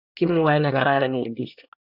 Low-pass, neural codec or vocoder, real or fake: 5.4 kHz; codec, 24 kHz, 1 kbps, SNAC; fake